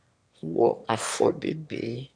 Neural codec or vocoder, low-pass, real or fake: autoencoder, 22.05 kHz, a latent of 192 numbers a frame, VITS, trained on one speaker; 9.9 kHz; fake